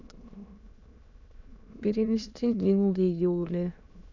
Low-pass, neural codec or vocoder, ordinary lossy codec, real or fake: 7.2 kHz; autoencoder, 22.05 kHz, a latent of 192 numbers a frame, VITS, trained on many speakers; none; fake